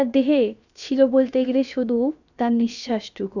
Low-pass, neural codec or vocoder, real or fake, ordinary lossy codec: 7.2 kHz; codec, 16 kHz, about 1 kbps, DyCAST, with the encoder's durations; fake; none